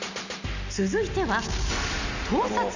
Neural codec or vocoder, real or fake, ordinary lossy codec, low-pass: none; real; none; 7.2 kHz